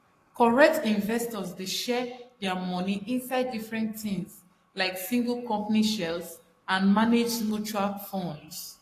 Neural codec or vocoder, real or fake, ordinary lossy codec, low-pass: codec, 44.1 kHz, 7.8 kbps, Pupu-Codec; fake; AAC, 48 kbps; 14.4 kHz